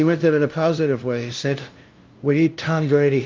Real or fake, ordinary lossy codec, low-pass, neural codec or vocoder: fake; Opus, 32 kbps; 7.2 kHz; codec, 16 kHz, 0.5 kbps, FunCodec, trained on LibriTTS, 25 frames a second